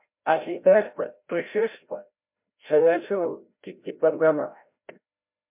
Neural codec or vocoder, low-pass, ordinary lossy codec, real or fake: codec, 16 kHz, 0.5 kbps, FreqCodec, larger model; 3.6 kHz; MP3, 32 kbps; fake